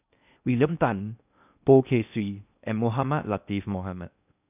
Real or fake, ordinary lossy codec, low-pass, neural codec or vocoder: fake; none; 3.6 kHz; codec, 16 kHz in and 24 kHz out, 0.6 kbps, FocalCodec, streaming, 4096 codes